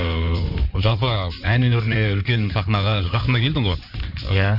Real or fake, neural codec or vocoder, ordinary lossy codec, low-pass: fake; codec, 16 kHz in and 24 kHz out, 2.2 kbps, FireRedTTS-2 codec; none; 5.4 kHz